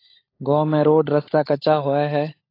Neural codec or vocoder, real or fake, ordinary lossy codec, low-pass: codec, 16 kHz, 16 kbps, FunCodec, trained on LibriTTS, 50 frames a second; fake; AAC, 24 kbps; 5.4 kHz